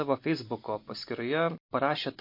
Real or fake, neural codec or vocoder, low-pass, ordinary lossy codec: real; none; 5.4 kHz; MP3, 32 kbps